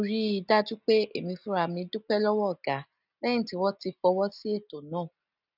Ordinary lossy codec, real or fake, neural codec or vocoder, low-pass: none; real; none; 5.4 kHz